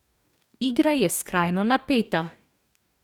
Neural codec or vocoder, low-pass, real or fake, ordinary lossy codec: codec, 44.1 kHz, 2.6 kbps, DAC; 19.8 kHz; fake; none